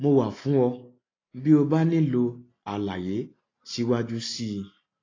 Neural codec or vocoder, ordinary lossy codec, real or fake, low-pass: none; AAC, 32 kbps; real; 7.2 kHz